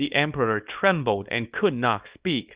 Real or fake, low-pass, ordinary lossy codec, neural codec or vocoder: fake; 3.6 kHz; Opus, 64 kbps; codec, 24 kHz, 0.9 kbps, WavTokenizer, small release